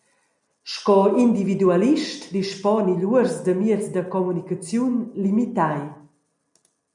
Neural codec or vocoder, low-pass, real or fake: none; 10.8 kHz; real